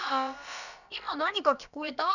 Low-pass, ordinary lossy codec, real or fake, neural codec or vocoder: 7.2 kHz; none; fake; codec, 16 kHz, about 1 kbps, DyCAST, with the encoder's durations